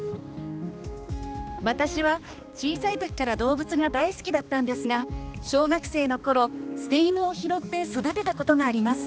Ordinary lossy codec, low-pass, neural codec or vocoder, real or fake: none; none; codec, 16 kHz, 2 kbps, X-Codec, HuBERT features, trained on balanced general audio; fake